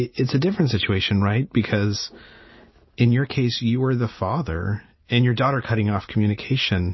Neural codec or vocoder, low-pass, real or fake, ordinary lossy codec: none; 7.2 kHz; real; MP3, 24 kbps